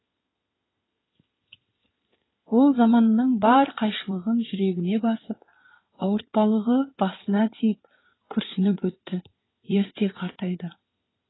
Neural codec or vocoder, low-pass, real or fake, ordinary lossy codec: codec, 16 kHz in and 24 kHz out, 2.2 kbps, FireRedTTS-2 codec; 7.2 kHz; fake; AAC, 16 kbps